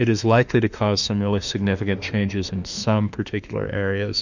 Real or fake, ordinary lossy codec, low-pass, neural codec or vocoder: fake; Opus, 64 kbps; 7.2 kHz; autoencoder, 48 kHz, 32 numbers a frame, DAC-VAE, trained on Japanese speech